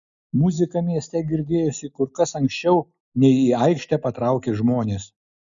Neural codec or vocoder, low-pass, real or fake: none; 7.2 kHz; real